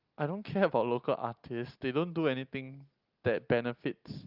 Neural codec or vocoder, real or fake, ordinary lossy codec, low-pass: none; real; Opus, 32 kbps; 5.4 kHz